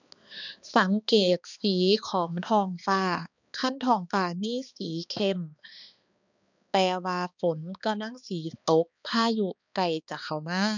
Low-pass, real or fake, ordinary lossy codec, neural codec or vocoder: 7.2 kHz; fake; none; codec, 16 kHz, 2 kbps, X-Codec, HuBERT features, trained on balanced general audio